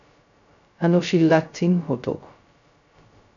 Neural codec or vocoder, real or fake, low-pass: codec, 16 kHz, 0.2 kbps, FocalCodec; fake; 7.2 kHz